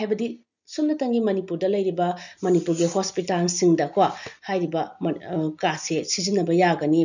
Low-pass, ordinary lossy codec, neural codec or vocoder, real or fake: 7.2 kHz; none; none; real